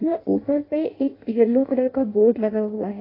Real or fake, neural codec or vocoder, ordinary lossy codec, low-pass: fake; codec, 16 kHz in and 24 kHz out, 0.6 kbps, FireRedTTS-2 codec; AAC, 24 kbps; 5.4 kHz